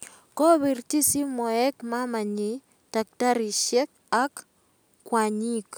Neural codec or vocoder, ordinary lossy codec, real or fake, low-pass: none; none; real; none